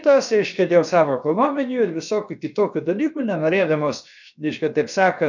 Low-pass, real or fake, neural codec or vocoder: 7.2 kHz; fake; codec, 16 kHz, about 1 kbps, DyCAST, with the encoder's durations